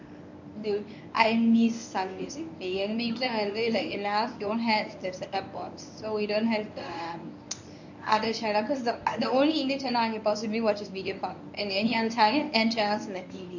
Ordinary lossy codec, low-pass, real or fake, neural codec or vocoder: none; 7.2 kHz; fake; codec, 24 kHz, 0.9 kbps, WavTokenizer, medium speech release version 1